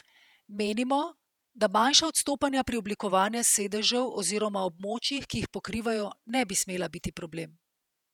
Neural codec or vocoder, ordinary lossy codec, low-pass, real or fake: vocoder, 48 kHz, 128 mel bands, Vocos; none; 19.8 kHz; fake